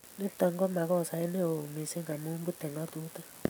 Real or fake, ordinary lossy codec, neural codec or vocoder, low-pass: real; none; none; none